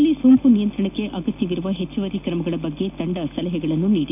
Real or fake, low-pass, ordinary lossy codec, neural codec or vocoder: real; 3.6 kHz; none; none